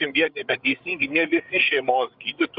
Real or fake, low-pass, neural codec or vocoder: fake; 5.4 kHz; codec, 16 kHz, 8 kbps, FreqCodec, larger model